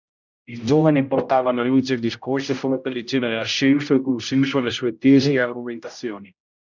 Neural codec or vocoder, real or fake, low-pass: codec, 16 kHz, 0.5 kbps, X-Codec, HuBERT features, trained on general audio; fake; 7.2 kHz